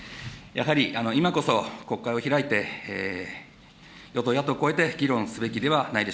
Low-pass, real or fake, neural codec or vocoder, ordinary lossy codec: none; real; none; none